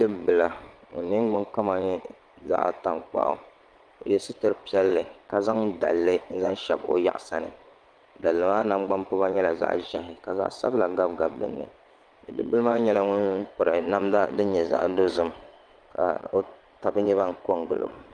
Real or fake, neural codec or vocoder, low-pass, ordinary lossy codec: fake; vocoder, 22.05 kHz, 80 mel bands, WaveNeXt; 9.9 kHz; Opus, 32 kbps